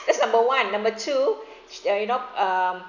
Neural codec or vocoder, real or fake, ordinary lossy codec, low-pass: none; real; none; 7.2 kHz